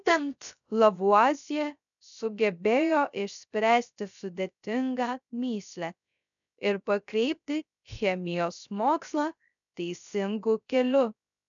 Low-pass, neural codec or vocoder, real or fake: 7.2 kHz; codec, 16 kHz, 0.3 kbps, FocalCodec; fake